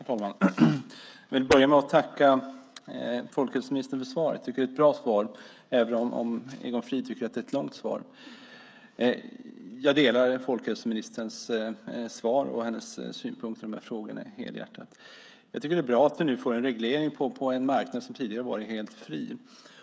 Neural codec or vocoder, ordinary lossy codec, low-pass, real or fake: codec, 16 kHz, 16 kbps, FreqCodec, smaller model; none; none; fake